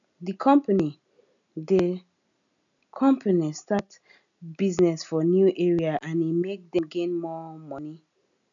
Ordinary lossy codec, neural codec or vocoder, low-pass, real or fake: none; none; 7.2 kHz; real